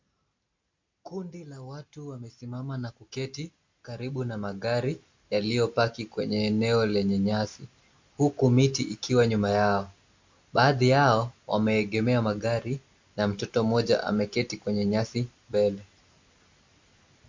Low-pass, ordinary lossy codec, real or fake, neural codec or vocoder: 7.2 kHz; MP3, 48 kbps; real; none